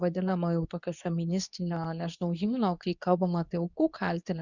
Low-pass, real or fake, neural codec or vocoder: 7.2 kHz; fake; codec, 24 kHz, 0.9 kbps, WavTokenizer, medium speech release version 2